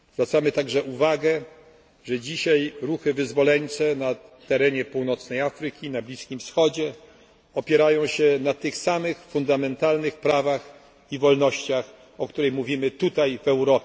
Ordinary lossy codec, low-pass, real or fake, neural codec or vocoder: none; none; real; none